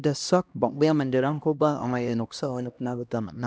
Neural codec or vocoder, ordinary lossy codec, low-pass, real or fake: codec, 16 kHz, 1 kbps, X-Codec, HuBERT features, trained on LibriSpeech; none; none; fake